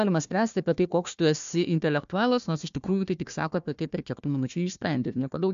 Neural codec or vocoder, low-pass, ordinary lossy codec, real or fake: codec, 16 kHz, 1 kbps, FunCodec, trained on Chinese and English, 50 frames a second; 7.2 kHz; MP3, 64 kbps; fake